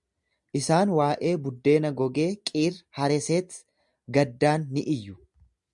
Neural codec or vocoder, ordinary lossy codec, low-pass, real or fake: none; MP3, 96 kbps; 10.8 kHz; real